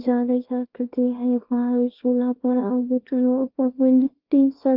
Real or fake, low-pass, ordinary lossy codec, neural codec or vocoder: fake; 5.4 kHz; Opus, 32 kbps; codec, 24 kHz, 0.9 kbps, WavTokenizer, small release